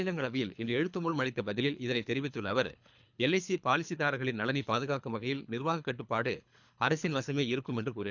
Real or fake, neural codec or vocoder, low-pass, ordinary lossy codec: fake; codec, 24 kHz, 3 kbps, HILCodec; 7.2 kHz; none